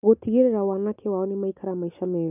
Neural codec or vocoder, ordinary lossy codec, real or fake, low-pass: none; none; real; 3.6 kHz